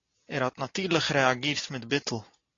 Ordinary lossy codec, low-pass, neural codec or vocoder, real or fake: AAC, 32 kbps; 7.2 kHz; none; real